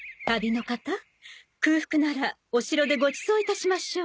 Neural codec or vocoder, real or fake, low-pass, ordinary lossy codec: none; real; none; none